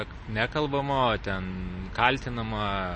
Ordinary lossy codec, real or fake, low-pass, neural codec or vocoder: MP3, 32 kbps; real; 9.9 kHz; none